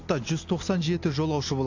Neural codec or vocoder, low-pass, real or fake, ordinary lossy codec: none; 7.2 kHz; real; AAC, 48 kbps